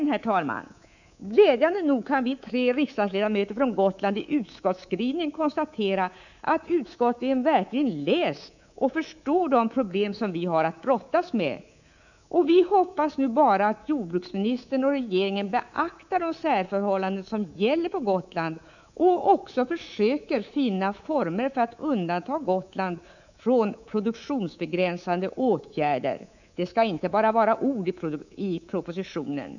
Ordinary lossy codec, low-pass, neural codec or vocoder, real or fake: none; 7.2 kHz; codec, 24 kHz, 3.1 kbps, DualCodec; fake